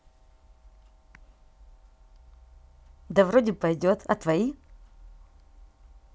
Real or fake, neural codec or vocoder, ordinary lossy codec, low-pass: real; none; none; none